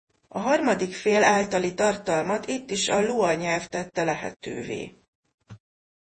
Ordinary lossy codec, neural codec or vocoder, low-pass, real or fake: MP3, 32 kbps; vocoder, 48 kHz, 128 mel bands, Vocos; 10.8 kHz; fake